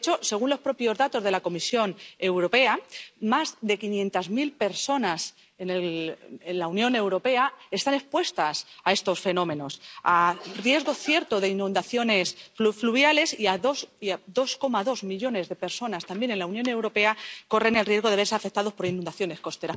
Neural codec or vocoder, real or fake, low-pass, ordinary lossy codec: none; real; none; none